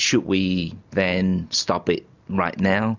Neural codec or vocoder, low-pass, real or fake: none; 7.2 kHz; real